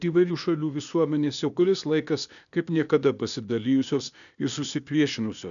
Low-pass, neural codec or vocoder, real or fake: 7.2 kHz; codec, 16 kHz, 0.8 kbps, ZipCodec; fake